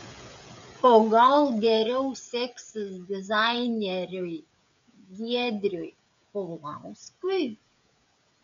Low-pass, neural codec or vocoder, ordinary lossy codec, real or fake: 7.2 kHz; codec, 16 kHz, 16 kbps, FreqCodec, smaller model; MP3, 64 kbps; fake